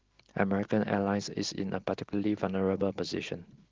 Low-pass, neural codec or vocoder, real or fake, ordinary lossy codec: 7.2 kHz; none; real; Opus, 16 kbps